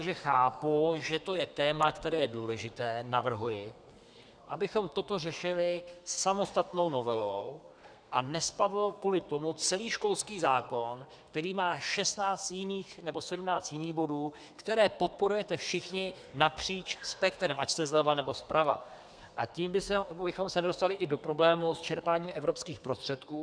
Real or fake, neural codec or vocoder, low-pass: fake; codec, 32 kHz, 1.9 kbps, SNAC; 9.9 kHz